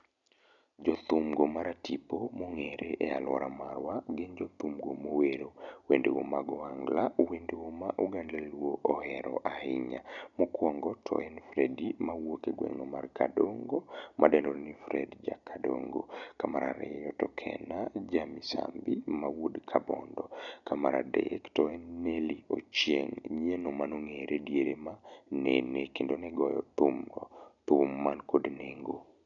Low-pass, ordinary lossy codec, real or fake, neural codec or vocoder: 7.2 kHz; none; real; none